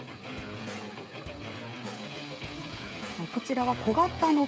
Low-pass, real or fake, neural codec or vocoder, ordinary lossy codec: none; fake; codec, 16 kHz, 16 kbps, FreqCodec, smaller model; none